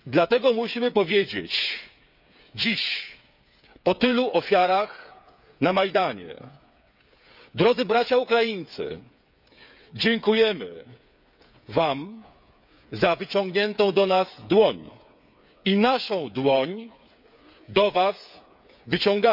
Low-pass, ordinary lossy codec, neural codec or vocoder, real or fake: 5.4 kHz; none; codec, 16 kHz, 8 kbps, FreqCodec, smaller model; fake